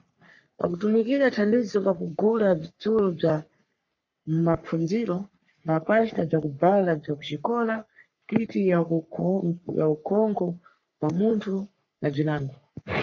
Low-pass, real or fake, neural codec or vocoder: 7.2 kHz; fake; codec, 44.1 kHz, 3.4 kbps, Pupu-Codec